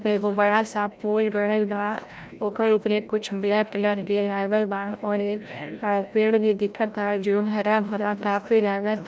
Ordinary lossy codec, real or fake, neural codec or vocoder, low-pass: none; fake; codec, 16 kHz, 0.5 kbps, FreqCodec, larger model; none